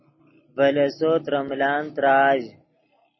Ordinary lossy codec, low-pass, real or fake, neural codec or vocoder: MP3, 24 kbps; 7.2 kHz; real; none